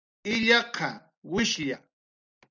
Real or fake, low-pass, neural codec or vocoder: real; 7.2 kHz; none